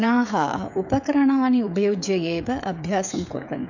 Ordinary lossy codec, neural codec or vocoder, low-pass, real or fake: none; codec, 16 kHz, 16 kbps, FreqCodec, smaller model; 7.2 kHz; fake